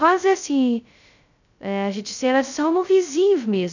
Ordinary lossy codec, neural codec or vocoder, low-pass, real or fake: none; codec, 16 kHz, 0.2 kbps, FocalCodec; 7.2 kHz; fake